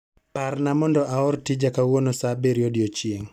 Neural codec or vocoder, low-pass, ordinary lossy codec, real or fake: none; 19.8 kHz; none; real